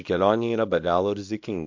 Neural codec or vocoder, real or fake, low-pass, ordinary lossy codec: codec, 24 kHz, 0.9 kbps, WavTokenizer, small release; fake; 7.2 kHz; MP3, 48 kbps